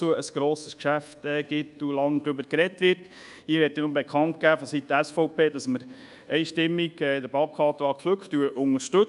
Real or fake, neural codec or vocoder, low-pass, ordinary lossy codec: fake; codec, 24 kHz, 1.2 kbps, DualCodec; 10.8 kHz; none